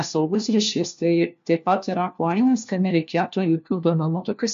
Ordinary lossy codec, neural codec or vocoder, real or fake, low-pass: MP3, 48 kbps; codec, 16 kHz, 1 kbps, FunCodec, trained on LibriTTS, 50 frames a second; fake; 7.2 kHz